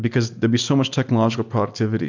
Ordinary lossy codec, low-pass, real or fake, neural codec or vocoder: MP3, 64 kbps; 7.2 kHz; real; none